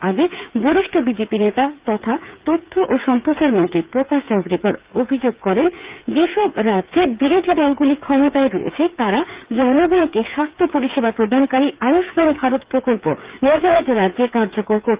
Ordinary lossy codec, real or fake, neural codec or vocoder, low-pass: Opus, 24 kbps; fake; codec, 16 kHz, 8 kbps, FreqCodec, smaller model; 3.6 kHz